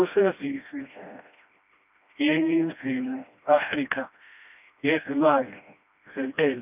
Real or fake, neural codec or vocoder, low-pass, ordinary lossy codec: fake; codec, 16 kHz, 1 kbps, FreqCodec, smaller model; 3.6 kHz; none